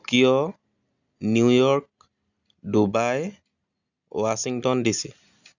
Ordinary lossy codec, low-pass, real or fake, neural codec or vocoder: none; 7.2 kHz; real; none